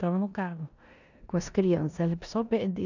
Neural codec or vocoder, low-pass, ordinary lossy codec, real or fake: codec, 16 kHz in and 24 kHz out, 0.9 kbps, LongCat-Audio-Codec, four codebook decoder; 7.2 kHz; none; fake